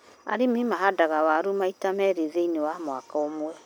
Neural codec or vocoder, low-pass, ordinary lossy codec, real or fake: none; none; none; real